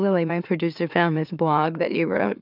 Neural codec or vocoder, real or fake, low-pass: autoencoder, 44.1 kHz, a latent of 192 numbers a frame, MeloTTS; fake; 5.4 kHz